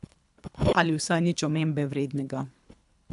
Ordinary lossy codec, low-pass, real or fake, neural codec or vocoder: AAC, 96 kbps; 10.8 kHz; fake; codec, 24 kHz, 3 kbps, HILCodec